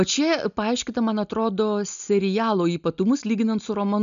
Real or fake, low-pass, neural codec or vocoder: real; 7.2 kHz; none